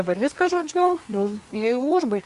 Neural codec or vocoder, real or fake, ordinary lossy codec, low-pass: codec, 24 kHz, 1 kbps, SNAC; fake; Opus, 64 kbps; 10.8 kHz